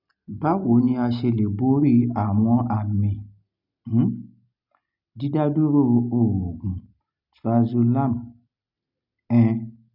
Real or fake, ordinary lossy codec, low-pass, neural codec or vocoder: real; none; 5.4 kHz; none